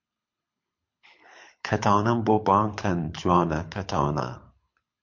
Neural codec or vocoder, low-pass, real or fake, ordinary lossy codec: codec, 24 kHz, 6 kbps, HILCodec; 7.2 kHz; fake; MP3, 48 kbps